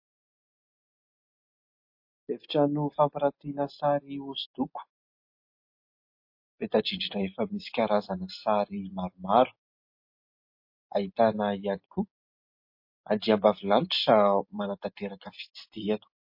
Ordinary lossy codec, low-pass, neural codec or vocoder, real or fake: MP3, 32 kbps; 5.4 kHz; none; real